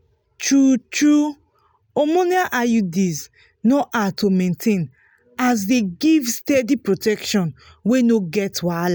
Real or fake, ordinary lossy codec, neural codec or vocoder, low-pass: real; none; none; none